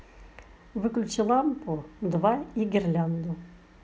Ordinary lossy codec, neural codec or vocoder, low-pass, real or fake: none; none; none; real